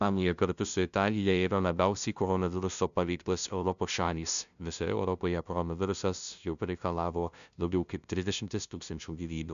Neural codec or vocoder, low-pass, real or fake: codec, 16 kHz, 0.5 kbps, FunCodec, trained on LibriTTS, 25 frames a second; 7.2 kHz; fake